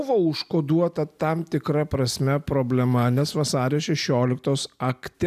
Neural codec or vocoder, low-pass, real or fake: none; 14.4 kHz; real